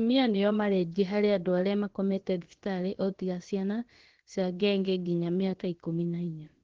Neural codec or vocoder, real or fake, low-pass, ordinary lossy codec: codec, 16 kHz, about 1 kbps, DyCAST, with the encoder's durations; fake; 7.2 kHz; Opus, 16 kbps